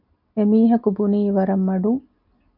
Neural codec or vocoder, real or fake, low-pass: none; real; 5.4 kHz